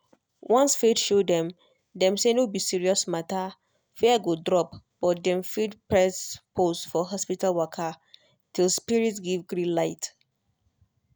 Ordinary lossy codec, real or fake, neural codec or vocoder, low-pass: none; real; none; none